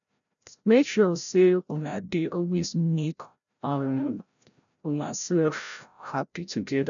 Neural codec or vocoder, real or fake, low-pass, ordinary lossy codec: codec, 16 kHz, 0.5 kbps, FreqCodec, larger model; fake; 7.2 kHz; none